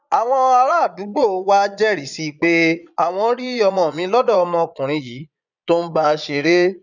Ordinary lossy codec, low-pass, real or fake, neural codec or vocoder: none; 7.2 kHz; fake; vocoder, 22.05 kHz, 80 mel bands, Vocos